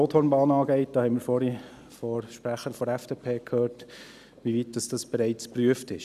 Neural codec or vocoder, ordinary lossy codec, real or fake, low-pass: none; none; real; 14.4 kHz